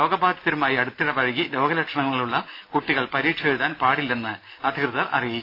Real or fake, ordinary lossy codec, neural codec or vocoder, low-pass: real; AAC, 32 kbps; none; 5.4 kHz